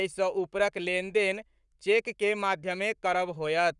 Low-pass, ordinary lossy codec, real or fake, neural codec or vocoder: 10.8 kHz; none; fake; codec, 44.1 kHz, 7.8 kbps, Pupu-Codec